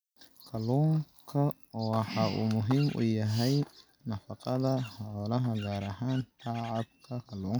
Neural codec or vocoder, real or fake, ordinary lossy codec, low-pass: none; real; none; none